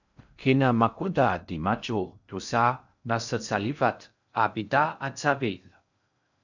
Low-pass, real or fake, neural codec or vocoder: 7.2 kHz; fake; codec, 16 kHz in and 24 kHz out, 0.6 kbps, FocalCodec, streaming, 4096 codes